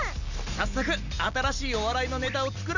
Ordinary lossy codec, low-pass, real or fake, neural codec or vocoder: none; 7.2 kHz; real; none